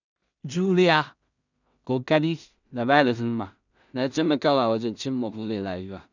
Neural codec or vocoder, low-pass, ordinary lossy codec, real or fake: codec, 16 kHz in and 24 kHz out, 0.4 kbps, LongCat-Audio-Codec, two codebook decoder; 7.2 kHz; none; fake